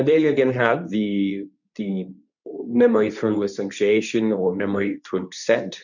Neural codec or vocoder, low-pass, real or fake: codec, 24 kHz, 0.9 kbps, WavTokenizer, medium speech release version 2; 7.2 kHz; fake